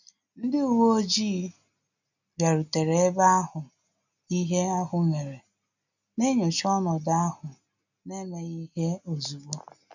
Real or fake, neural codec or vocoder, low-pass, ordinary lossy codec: real; none; 7.2 kHz; none